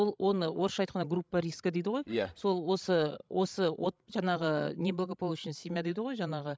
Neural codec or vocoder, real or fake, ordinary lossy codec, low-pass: codec, 16 kHz, 16 kbps, FreqCodec, larger model; fake; none; none